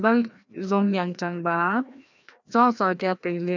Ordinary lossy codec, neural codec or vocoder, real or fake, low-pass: none; codec, 16 kHz, 1 kbps, FreqCodec, larger model; fake; 7.2 kHz